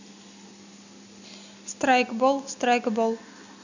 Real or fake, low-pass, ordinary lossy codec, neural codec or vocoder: real; 7.2 kHz; none; none